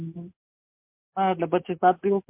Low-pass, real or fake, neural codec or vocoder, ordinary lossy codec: 3.6 kHz; real; none; MP3, 32 kbps